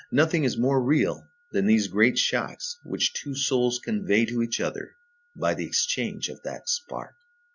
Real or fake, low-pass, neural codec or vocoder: real; 7.2 kHz; none